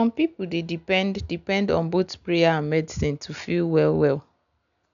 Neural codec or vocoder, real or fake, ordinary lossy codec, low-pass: none; real; none; 7.2 kHz